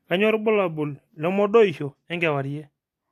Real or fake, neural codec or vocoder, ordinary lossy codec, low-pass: real; none; AAC, 64 kbps; 14.4 kHz